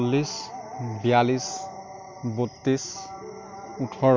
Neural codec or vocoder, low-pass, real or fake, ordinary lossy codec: none; 7.2 kHz; real; MP3, 64 kbps